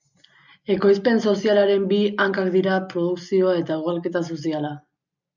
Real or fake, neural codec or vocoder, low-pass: real; none; 7.2 kHz